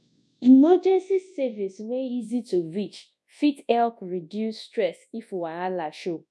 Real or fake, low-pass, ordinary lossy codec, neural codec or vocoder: fake; none; none; codec, 24 kHz, 0.9 kbps, WavTokenizer, large speech release